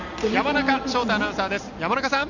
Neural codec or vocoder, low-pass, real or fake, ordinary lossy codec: none; 7.2 kHz; real; none